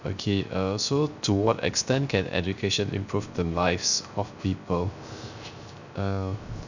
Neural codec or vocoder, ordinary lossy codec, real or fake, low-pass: codec, 16 kHz, 0.3 kbps, FocalCodec; none; fake; 7.2 kHz